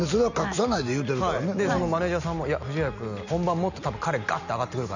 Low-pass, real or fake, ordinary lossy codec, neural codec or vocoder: 7.2 kHz; real; none; none